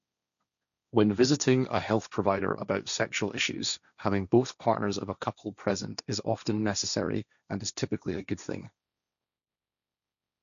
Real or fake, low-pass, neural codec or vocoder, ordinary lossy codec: fake; 7.2 kHz; codec, 16 kHz, 1.1 kbps, Voila-Tokenizer; none